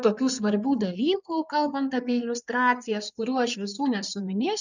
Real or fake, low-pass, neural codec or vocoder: fake; 7.2 kHz; codec, 16 kHz in and 24 kHz out, 2.2 kbps, FireRedTTS-2 codec